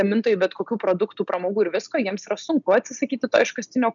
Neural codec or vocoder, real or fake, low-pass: none; real; 7.2 kHz